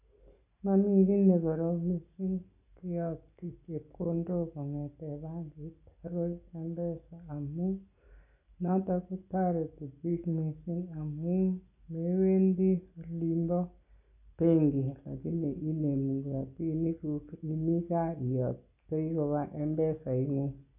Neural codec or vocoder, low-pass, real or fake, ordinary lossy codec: none; 3.6 kHz; real; none